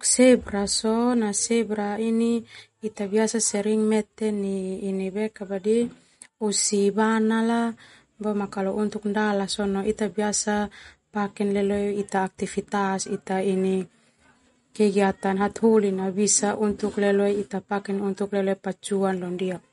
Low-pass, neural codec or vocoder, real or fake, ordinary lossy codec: 19.8 kHz; none; real; MP3, 48 kbps